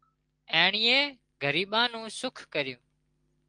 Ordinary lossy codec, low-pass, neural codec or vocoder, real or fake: Opus, 24 kbps; 9.9 kHz; none; real